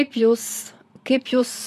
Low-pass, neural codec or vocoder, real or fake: 14.4 kHz; vocoder, 44.1 kHz, 128 mel bands, Pupu-Vocoder; fake